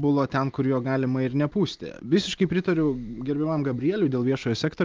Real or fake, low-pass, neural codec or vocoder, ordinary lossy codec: real; 7.2 kHz; none; Opus, 32 kbps